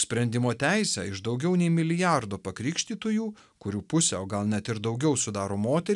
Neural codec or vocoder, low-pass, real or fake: none; 10.8 kHz; real